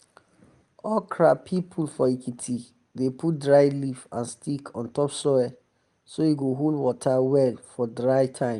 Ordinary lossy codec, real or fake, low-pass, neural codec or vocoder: Opus, 32 kbps; real; 10.8 kHz; none